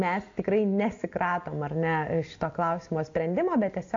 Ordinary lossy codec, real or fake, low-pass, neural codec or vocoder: AAC, 48 kbps; real; 7.2 kHz; none